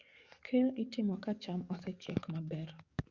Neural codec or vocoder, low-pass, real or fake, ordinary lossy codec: codec, 44.1 kHz, 7.8 kbps, DAC; 7.2 kHz; fake; Opus, 64 kbps